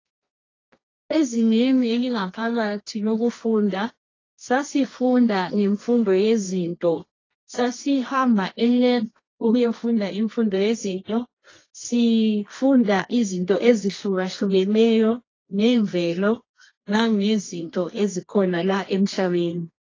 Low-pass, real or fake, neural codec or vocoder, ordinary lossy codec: 7.2 kHz; fake; codec, 24 kHz, 0.9 kbps, WavTokenizer, medium music audio release; AAC, 32 kbps